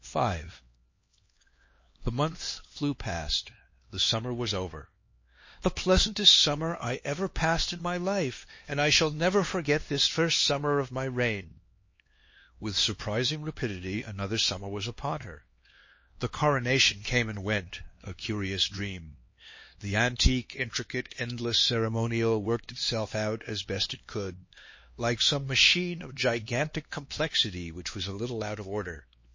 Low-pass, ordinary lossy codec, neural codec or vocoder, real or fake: 7.2 kHz; MP3, 32 kbps; codec, 16 kHz, 2 kbps, X-Codec, HuBERT features, trained on LibriSpeech; fake